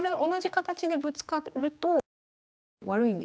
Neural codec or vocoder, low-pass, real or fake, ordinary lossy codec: codec, 16 kHz, 2 kbps, X-Codec, HuBERT features, trained on general audio; none; fake; none